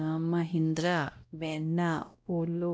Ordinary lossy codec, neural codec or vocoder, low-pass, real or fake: none; codec, 16 kHz, 0.5 kbps, X-Codec, WavLM features, trained on Multilingual LibriSpeech; none; fake